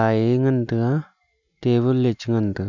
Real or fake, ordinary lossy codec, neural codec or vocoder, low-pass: real; none; none; 7.2 kHz